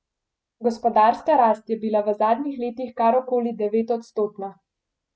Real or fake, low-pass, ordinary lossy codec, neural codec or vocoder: real; none; none; none